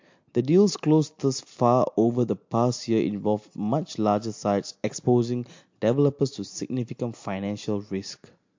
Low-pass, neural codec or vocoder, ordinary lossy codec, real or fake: 7.2 kHz; none; MP3, 48 kbps; real